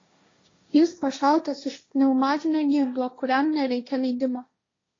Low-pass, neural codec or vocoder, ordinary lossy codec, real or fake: 7.2 kHz; codec, 16 kHz, 1.1 kbps, Voila-Tokenizer; AAC, 32 kbps; fake